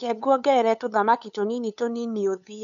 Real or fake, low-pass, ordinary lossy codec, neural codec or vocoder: fake; 7.2 kHz; none; codec, 16 kHz, 8 kbps, FunCodec, trained on LibriTTS, 25 frames a second